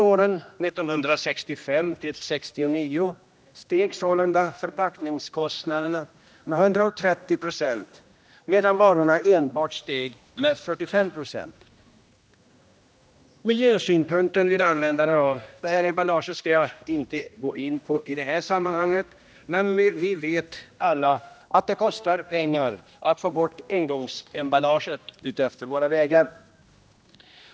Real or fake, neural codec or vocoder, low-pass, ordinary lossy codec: fake; codec, 16 kHz, 1 kbps, X-Codec, HuBERT features, trained on general audio; none; none